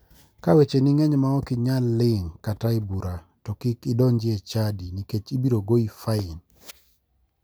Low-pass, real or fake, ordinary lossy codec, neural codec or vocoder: none; real; none; none